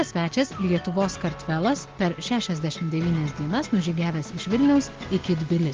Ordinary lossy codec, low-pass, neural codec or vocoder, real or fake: Opus, 16 kbps; 7.2 kHz; none; real